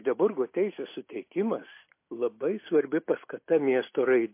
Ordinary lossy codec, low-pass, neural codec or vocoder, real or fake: MP3, 24 kbps; 3.6 kHz; none; real